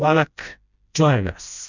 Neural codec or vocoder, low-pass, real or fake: codec, 16 kHz, 1 kbps, FreqCodec, smaller model; 7.2 kHz; fake